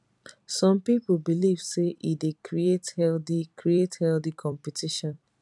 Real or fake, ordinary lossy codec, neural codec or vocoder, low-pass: fake; none; vocoder, 44.1 kHz, 128 mel bands every 256 samples, BigVGAN v2; 10.8 kHz